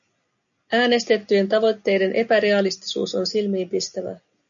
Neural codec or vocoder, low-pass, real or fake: none; 7.2 kHz; real